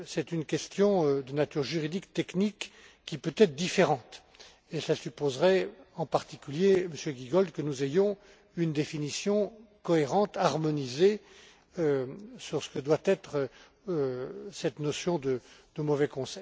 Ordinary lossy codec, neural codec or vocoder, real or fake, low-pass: none; none; real; none